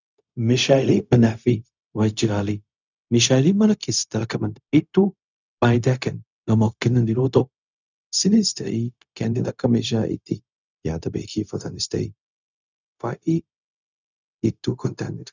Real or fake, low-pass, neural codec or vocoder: fake; 7.2 kHz; codec, 16 kHz, 0.4 kbps, LongCat-Audio-Codec